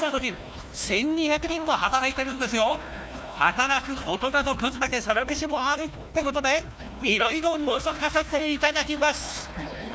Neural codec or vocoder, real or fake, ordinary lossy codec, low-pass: codec, 16 kHz, 1 kbps, FunCodec, trained on Chinese and English, 50 frames a second; fake; none; none